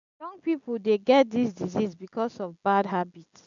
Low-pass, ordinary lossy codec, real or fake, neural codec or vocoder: 7.2 kHz; none; real; none